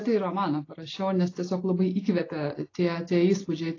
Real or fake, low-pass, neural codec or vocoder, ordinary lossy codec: real; 7.2 kHz; none; AAC, 32 kbps